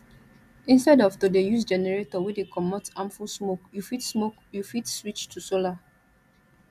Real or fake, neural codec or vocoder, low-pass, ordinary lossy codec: real; none; 14.4 kHz; none